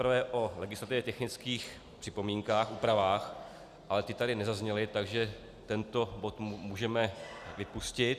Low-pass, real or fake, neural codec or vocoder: 14.4 kHz; real; none